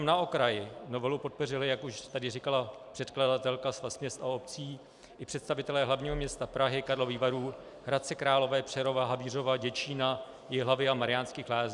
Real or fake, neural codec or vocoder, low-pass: real; none; 10.8 kHz